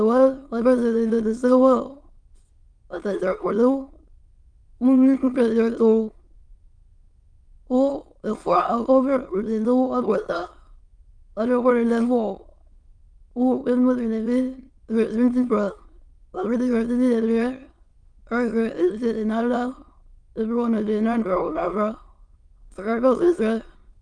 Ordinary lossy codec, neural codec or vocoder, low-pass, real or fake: Opus, 32 kbps; autoencoder, 22.05 kHz, a latent of 192 numbers a frame, VITS, trained on many speakers; 9.9 kHz; fake